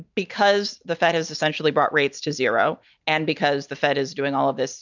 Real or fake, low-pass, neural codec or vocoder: real; 7.2 kHz; none